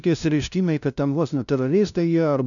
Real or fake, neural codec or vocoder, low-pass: fake; codec, 16 kHz, 0.5 kbps, FunCodec, trained on LibriTTS, 25 frames a second; 7.2 kHz